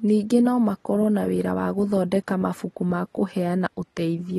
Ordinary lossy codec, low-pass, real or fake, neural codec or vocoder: AAC, 32 kbps; 19.8 kHz; real; none